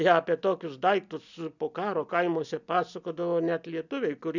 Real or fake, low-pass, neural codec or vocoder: real; 7.2 kHz; none